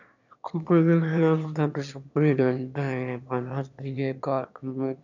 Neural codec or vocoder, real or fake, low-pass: autoencoder, 22.05 kHz, a latent of 192 numbers a frame, VITS, trained on one speaker; fake; 7.2 kHz